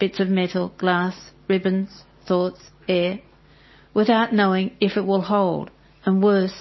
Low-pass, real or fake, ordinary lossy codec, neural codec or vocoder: 7.2 kHz; real; MP3, 24 kbps; none